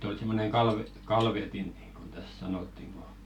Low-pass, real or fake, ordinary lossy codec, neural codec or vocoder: 19.8 kHz; real; none; none